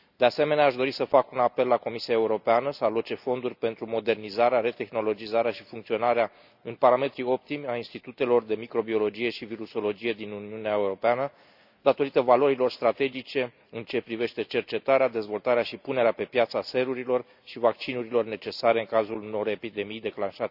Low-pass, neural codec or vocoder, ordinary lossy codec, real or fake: 5.4 kHz; none; none; real